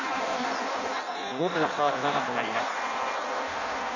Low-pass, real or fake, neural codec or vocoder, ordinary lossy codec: 7.2 kHz; fake; codec, 16 kHz in and 24 kHz out, 0.6 kbps, FireRedTTS-2 codec; none